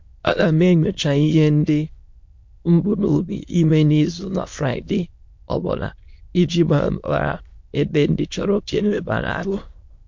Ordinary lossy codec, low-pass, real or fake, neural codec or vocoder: MP3, 48 kbps; 7.2 kHz; fake; autoencoder, 22.05 kHz, a latent of 192 numbers a frame, VITS, trained on many speakers